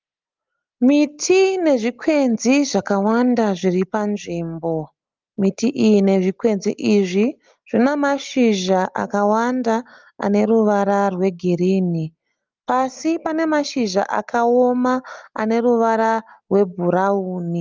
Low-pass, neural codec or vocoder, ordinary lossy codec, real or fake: 7.2 kHz; none; Opus, 32 kbps; real